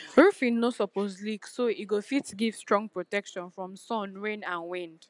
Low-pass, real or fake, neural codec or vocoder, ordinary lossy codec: 10.8 kHz; real; none; none